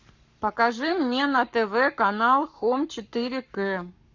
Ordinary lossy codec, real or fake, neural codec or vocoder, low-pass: Opus, 64 kbps; fake; codec, 44.1 kHz, 7.8 kbps, Pupu-Codec; 7.2 kHz